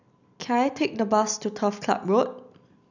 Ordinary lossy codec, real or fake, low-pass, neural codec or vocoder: none; real; 7.2 kHz; none